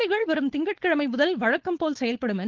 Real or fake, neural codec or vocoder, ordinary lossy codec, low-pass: fake; codec, 16 kHz, 4.8 kbps, FACodec; Opus, 16 kbps; 7.2 kHz